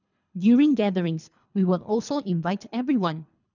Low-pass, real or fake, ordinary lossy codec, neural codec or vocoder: 7.2 kHz; fake; none; codec, 24 kHz, 3 kbps, HILCodec